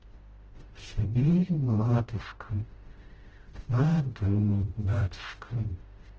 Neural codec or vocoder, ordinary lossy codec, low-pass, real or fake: codec, 16 kHz, 0.5 kbps, FreqCodec, smaller model; Opus, 16 kbps; 7.2 kHz; fake